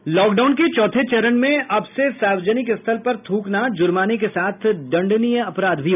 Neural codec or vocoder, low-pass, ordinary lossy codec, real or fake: none; 3.6 kHz; none; real